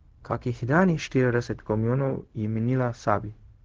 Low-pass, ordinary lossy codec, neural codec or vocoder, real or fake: 7.2 kHz; Opus, 16 kbps; codec, 16 kHz, 0.4 kbps, LongCat-Audio-Codec; fake